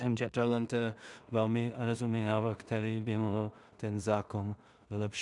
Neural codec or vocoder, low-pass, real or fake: codec, 16 kHz in and 24 kHz out, 0.4 kbps, LongCat-Audio-Codec, two codebook decoder; 10.8 kHz; fake